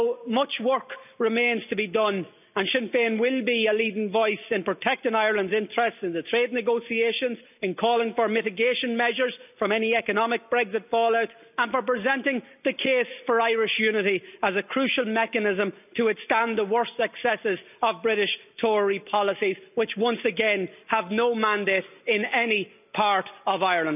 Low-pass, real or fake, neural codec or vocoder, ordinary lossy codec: 3.6 kHz; real; none; none